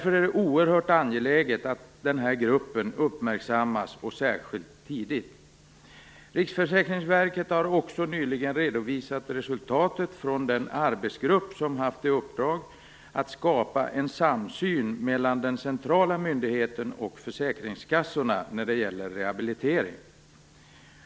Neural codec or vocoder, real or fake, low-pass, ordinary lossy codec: none; real; none; none